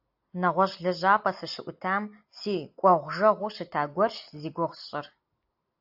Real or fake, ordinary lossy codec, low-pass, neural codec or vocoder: real; AAC, 48 kbps; 5.4 kHz; none